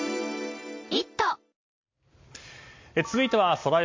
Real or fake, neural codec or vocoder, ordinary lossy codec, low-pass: real; none; MP3, 48 kbps; 7.2 kHz